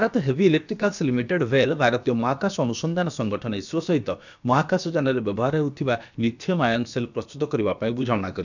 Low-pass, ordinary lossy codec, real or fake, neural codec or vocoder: 7.2 kHz; none; fake; codec, 16 kHz, about 1 kbps, DyCAST, with the encoder's durations